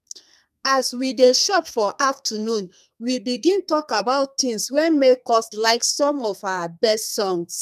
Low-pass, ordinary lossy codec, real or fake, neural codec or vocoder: 14.4 kHz; none; fake; codec, 32 kHz, 1.9 kbps, SNAC